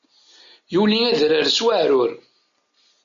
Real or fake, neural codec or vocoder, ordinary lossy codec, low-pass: real; none; MP3, 96 kbps; 7.2 kHz